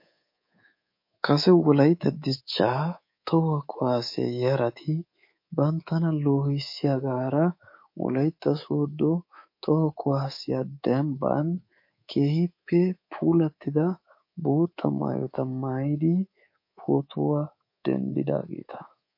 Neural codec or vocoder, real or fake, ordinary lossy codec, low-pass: codec, 24 kHz, 3.1 kbps, DualCodec; fake; MP3, 32 kbps; 5.4 kHz